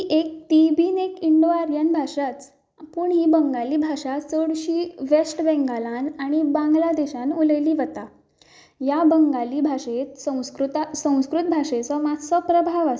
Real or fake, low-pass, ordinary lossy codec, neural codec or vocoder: real; none; none; none